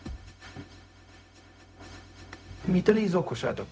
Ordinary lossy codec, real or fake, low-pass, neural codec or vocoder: none; fake; none; codec, 16 kHz, 0.4 kbps, LongCat-Audio-Codec